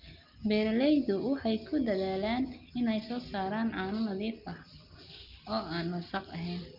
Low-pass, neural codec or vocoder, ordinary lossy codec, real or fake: 5.4 kHz; none; Opus, 24 kbps; real